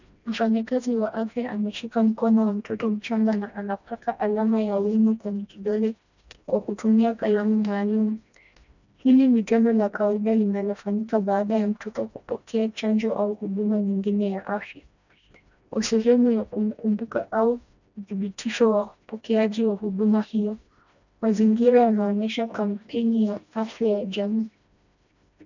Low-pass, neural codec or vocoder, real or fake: 7.2 kHz; codec, 16 kHz, 1 kbps, FreqCodec, smaller model; fake